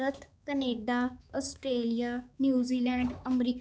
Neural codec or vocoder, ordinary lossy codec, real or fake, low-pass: codec, 16 kHz, 4 kbps, X-Codec, HuBERT features, trained on balanced general audio; none; fake; none